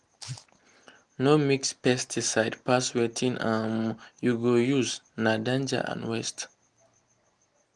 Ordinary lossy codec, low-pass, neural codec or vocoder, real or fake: Opus, 24 kbps; 10.8 kHz; none; real